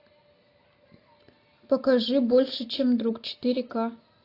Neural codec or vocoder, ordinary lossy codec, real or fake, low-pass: none; AAC, 32 kbps; real; 5.4 kHz